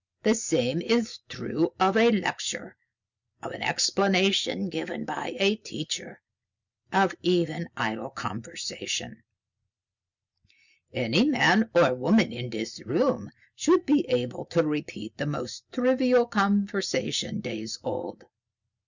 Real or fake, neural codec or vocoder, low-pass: real; none; 7.2 kHz